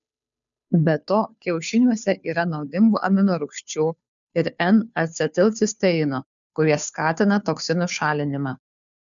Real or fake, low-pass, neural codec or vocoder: fake; 7.2 kHz; codec, 16 kHz, 2 kbps, FunCodec, trained on Chinese and English, 25 frames a second